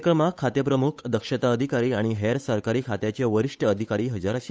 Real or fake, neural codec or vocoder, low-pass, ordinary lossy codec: fake; codec, 16 kHz, 8 kbps, FunCodec, trained on Chinese and English, 25 frames a second; none; none